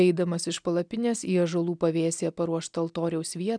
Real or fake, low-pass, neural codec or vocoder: real; 9.9 kHz; none